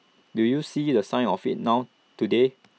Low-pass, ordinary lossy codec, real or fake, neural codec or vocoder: none; none; real; none